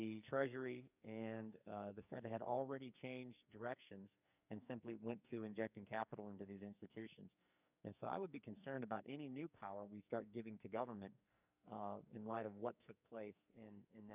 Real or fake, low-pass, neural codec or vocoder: fake; 3.6 kHz; codec, 44.1 kHz, 2.6 kbps, SNAC